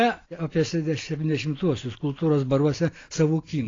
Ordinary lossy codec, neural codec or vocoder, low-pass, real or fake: AAC, 32 kbps; none; 7.2 kHz; real